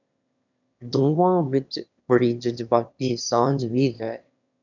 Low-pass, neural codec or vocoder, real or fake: 7.2 kHz; autoencoder, 22.05 kHz, a latent of 192 numbers a frame, VITS, trained on one speaker; fake